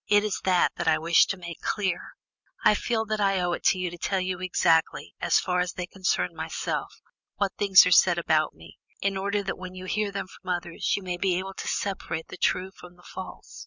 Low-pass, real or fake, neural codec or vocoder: 7.2 kHz; real; none